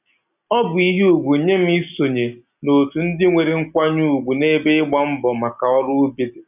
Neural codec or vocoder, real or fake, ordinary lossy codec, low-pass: none; real; none; 3.6 kHz